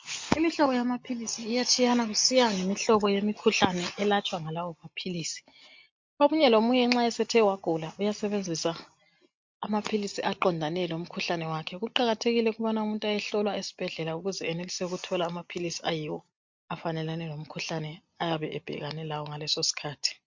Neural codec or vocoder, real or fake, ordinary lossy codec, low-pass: none; real; MP3, 48 kbps; 7.2 kHz